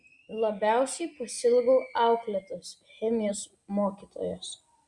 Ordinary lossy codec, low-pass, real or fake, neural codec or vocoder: Opus, 64 kbps; 10.8 kHz; real; none